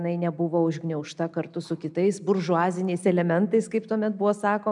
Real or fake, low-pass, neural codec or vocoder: real; 10.8 kHz; none